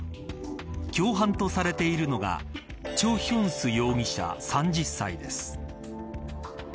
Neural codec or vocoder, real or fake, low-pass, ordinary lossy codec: none; real; none; none